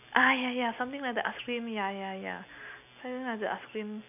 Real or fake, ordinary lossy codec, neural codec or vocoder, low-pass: real; none; none; 3.6 kHz